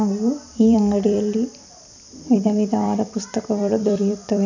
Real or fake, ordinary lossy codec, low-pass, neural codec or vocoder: fake; none; 7.2 kHz; vocoder, 44.1 kHz, 128 mel bands every 256 samples, BigVGAN v2